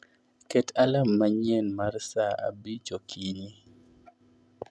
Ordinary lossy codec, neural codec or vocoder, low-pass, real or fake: none; none; none; real